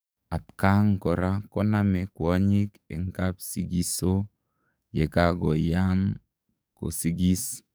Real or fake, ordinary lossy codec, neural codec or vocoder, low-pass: fake; none; codec, 44.1 kHz, 7.8 kbps, DAC; none